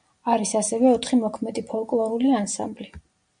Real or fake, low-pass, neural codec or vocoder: real; 9.9 kHz; none